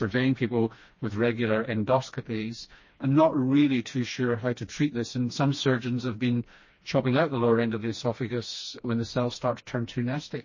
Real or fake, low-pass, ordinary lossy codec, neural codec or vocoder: fake; 7.2 kHz; MP3, 32 kbps; codec, 16 kHz, 2 kbps, FreqCodec, smaller model